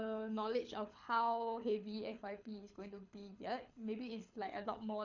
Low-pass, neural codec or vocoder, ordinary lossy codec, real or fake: 7.2 kHz; codec, 24 kHz, 6 kbps, HILCodec; none; fake